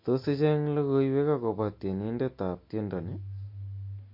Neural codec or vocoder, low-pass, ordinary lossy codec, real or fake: none; 5.4 kHz; MP3, 32 kbps; real